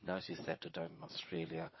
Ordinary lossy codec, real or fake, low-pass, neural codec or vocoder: MP3, 24 kbps; fake; 7.2 kHz; vocoder, 22.05 kHz, 80 mel bands, Vocos